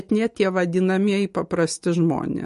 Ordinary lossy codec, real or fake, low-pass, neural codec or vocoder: MP3, 48 kbps; real; 14.4 kHz; none